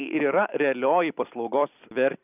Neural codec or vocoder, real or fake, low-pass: vocoder, 44.1 kHz, 128 mel bands every 256 samples, BigVGAN v2; fake; 3.6 kHz